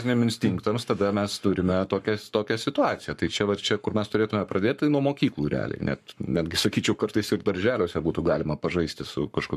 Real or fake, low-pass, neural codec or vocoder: fake; 14.4 kHz; codec, 44.1 kHz, 7.8 kbps, Pupu-Codec